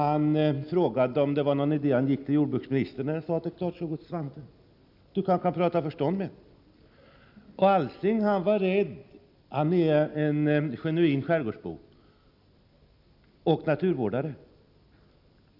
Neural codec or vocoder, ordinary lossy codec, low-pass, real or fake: none; none; 5.4 kHz; real